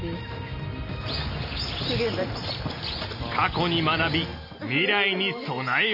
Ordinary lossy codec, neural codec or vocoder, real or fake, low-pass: none; none; real; 5.4 kHz